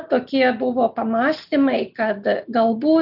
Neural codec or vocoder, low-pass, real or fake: none; 5.4 kHz; real